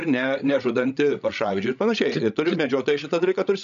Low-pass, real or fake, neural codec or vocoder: 7.2 kHz; fake; codec, 16 kHz, 16 kbps, FreqCodec, larger model